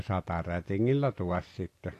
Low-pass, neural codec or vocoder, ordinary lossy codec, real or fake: 14.4 kHz; none; AAC, 64 kbps; real